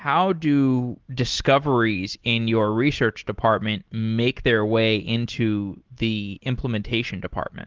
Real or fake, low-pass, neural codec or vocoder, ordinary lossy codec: real; 7.2 kHz; none; Opus, 16 kbps